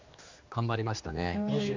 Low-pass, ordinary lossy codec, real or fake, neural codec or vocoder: 7.2 kHz; MP3, 64 kbps; fake; codec, 16 kHz, 2 kbps, X-Codec, HuBERT features, trained on general audio